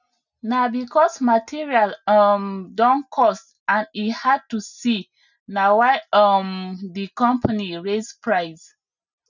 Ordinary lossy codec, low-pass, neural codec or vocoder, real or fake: none; 7.2 kHz; none; real